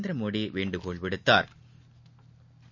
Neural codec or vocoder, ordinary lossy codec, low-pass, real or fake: none; none; 7.2 kHz; real